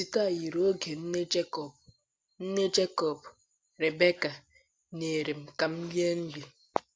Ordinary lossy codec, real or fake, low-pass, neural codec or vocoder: none; real; none; none